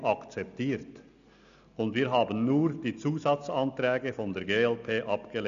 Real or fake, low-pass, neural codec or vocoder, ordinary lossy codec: real; 7.2 kHz; none; AAC, 96 kbps